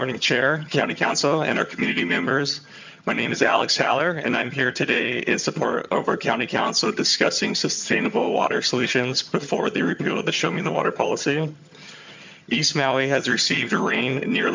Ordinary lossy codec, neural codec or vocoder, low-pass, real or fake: MP3, 64 kbps; vocoder, 22.05 kHz, 80 mel bands, HiFi-GAN; 7.2 kHz; fake